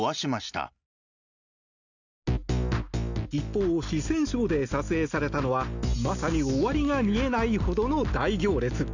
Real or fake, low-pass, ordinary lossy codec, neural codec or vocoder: real; 7.2 kHz; none; none